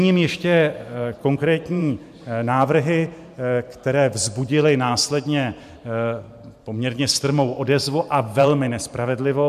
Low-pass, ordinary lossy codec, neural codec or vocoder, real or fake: 14.4 kHz; MP3, 96 kbps; vocoder, 44.1 kHz, 128 mel bands every 512 samples, BigVGAN v2; fake